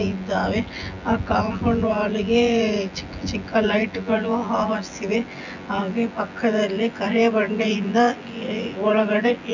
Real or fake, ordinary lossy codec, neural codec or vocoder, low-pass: fake; none; vocoder, 24 kHz, 100 mel bands, Vocos; 7.2 kHz